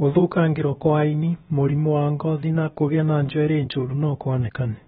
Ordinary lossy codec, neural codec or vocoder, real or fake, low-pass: AAC, 16 kbps; codec, 16 kHz, about 1 kbps, DyCAST, with the encoder's durations; fake; 7.2 kHz